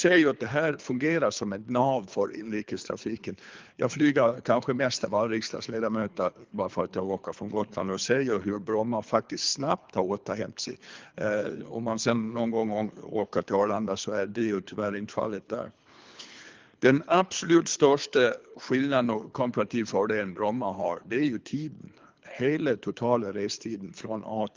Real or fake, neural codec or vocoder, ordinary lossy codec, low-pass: fake; codec, 24 kHz, 3 kbps, HILCodec; Opus, 32 kbps; 7.2 kHz